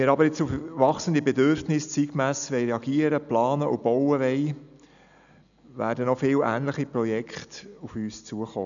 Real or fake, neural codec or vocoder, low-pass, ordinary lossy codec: real; none; 7.2 kHz; none